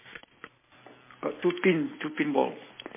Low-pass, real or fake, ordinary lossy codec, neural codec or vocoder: 3.6 kHz; real; MP3, 16 kbps; none